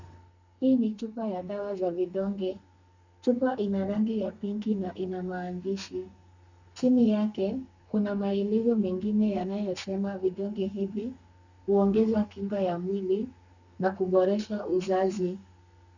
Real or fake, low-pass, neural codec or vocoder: fake; 7.2 kHz; codec, 32 kHz, 1.9 kbps, SNAC